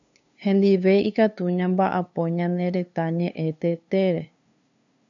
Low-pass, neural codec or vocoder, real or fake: 7.2 kHz; codec, 16 kHz, 2 kbps, FunCodec, trained on LibriTTS, 25 frames a second; fake